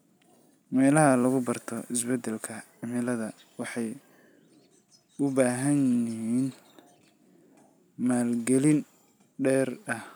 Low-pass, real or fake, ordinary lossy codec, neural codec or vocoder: none; real; none; none